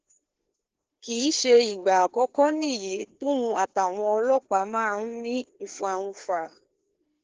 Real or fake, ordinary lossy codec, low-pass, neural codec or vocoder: fake; Opus, 16 kbps; 7.2 kHz; codec, 16 kHz, 2 kbps, FreqCodec, larger model